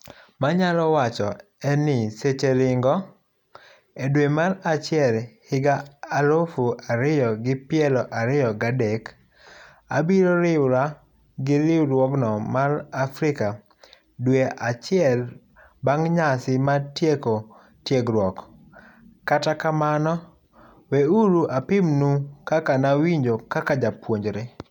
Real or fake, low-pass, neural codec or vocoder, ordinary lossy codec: real; 19.8 kHz; none; none